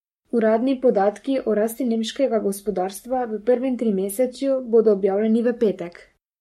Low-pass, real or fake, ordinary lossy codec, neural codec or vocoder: 19.8 kHz; fake; MP3, 64 kbps; codec, 44.1 kHz, 7.8 kbps, Pupu-Codec